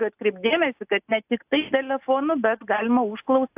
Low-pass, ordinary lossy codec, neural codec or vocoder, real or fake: 3.6 kHz; AAC, 32 kbps; none; real